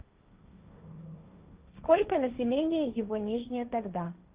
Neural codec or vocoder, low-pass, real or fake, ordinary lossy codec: codec, 16 kHz, 1.1 kbps, Voila-Tokenizer; 3.6 kHz; fake; Opus, 32 kbps